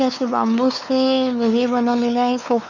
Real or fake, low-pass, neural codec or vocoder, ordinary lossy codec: fake; 7.2 kHz; codec, 16 kHz, 4 kbps, X-Codec, WavLM features, trained on Multilingual LibriSpeech; none